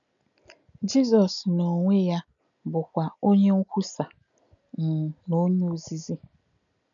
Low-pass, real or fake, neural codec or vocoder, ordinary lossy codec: 7.2 kHz; real; none; none